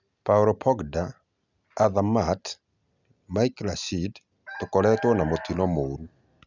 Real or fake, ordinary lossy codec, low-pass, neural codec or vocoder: real; none; 7.2 kHz; none